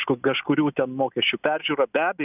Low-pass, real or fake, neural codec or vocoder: 3.6 kHz; real; none